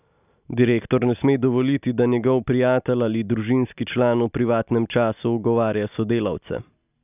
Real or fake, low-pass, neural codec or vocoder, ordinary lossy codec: real; 3.6 kHz; none; none